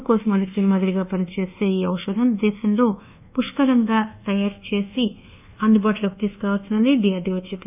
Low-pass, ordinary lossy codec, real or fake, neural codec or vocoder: 3.6 kHz; none; fake; codec, 24 kHz, 1.2 kbps, DualCodec